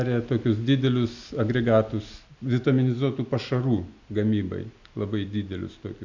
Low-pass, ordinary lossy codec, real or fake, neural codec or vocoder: 7.2 kHz; MP3, 64 kbps; real; none